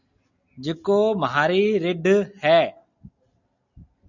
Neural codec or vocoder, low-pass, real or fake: none; 7.2 kHz; real